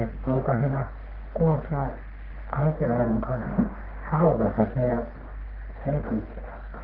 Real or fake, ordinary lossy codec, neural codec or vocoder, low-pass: fake; Opus, 16 kbps; codec, 44.1 kHz, 1.7 kbps, Pupu-Codec; 5.4 kHz